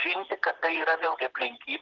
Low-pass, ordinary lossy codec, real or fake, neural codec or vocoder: 7.2 kHz; Opus, 32 kbps; fake; vocoder, 44.1 kHz, 128 mel bands, Pupu-Vocoder